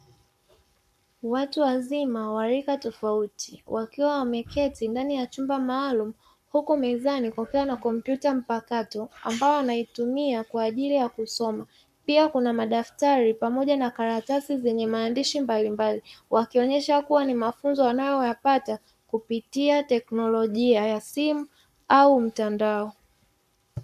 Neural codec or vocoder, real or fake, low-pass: codec, 44.1 kHz, 7.8 kbps, Pupu-Codec; fake; 14.4 kHz